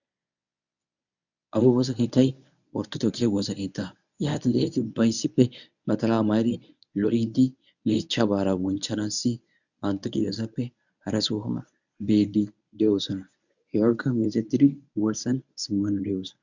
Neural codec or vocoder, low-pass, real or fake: codec, 24 kHz, 0.9 kbps, WavTokenizer, medium speech release version 1; 7.2 kHz; fake